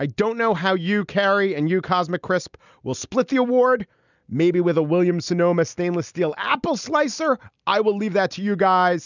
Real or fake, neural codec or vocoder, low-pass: real; none; 7.2 kHz